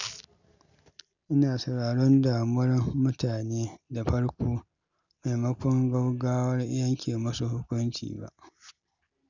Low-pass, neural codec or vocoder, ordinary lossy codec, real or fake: 7.2 kHz; none; none; real